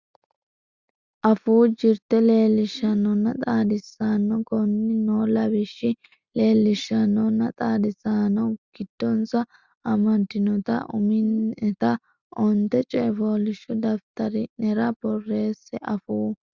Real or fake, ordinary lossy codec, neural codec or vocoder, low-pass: real; Opus, 64 kbps; none; 7.2 kHz